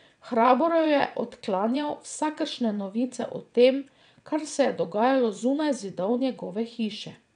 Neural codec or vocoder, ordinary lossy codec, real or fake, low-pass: vocoder, 22.05 kHz, 80 mel bands, WaveNeXt; none; fake; 9.9 kHz